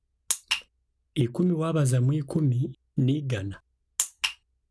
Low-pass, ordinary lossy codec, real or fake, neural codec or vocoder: none; none; real; none